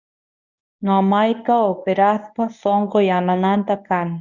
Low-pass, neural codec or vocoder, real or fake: 7.2 kHz; codec, 24 kHz, 0.9 kbps, WavTokenizer, medium speech release version 1; fake